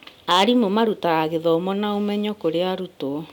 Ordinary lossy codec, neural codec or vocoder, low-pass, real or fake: none; none; 19.8 kHz; real